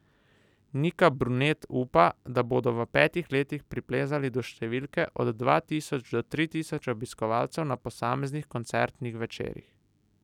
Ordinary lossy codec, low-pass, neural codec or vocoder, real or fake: none; 19.8 kHz; none; real